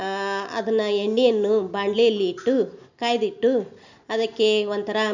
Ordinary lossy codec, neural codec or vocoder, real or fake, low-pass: none; none; real; 7.2 kHz